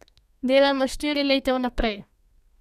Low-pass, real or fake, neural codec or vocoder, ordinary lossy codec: 14.4 kHz; fake; codec, 32 kHz, 1.9 kbps, SNAC; none